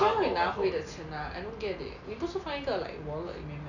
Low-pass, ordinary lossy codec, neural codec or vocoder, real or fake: 7.2 kHz; none; none; real